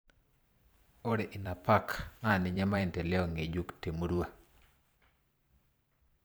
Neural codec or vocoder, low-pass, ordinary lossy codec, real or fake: vocoder, 44.1 kHz, 128 mel bands every 512 samples, BigVGAN v2; none; none; fake